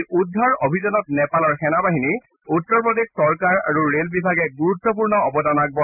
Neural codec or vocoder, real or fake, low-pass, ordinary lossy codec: none; real; 3.6 kHz; none